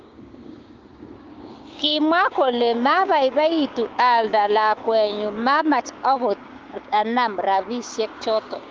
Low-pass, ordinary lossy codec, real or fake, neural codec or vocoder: 7.2 kHz; Opus, 24 kbps; fake; codec, 16 kHz, 6 kbps, DAC